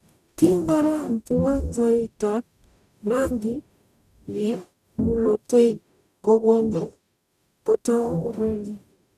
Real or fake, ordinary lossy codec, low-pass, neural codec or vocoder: fake; none; 14.4 kHz; codec, 44.1 kHz, 0.9 kbps, DAC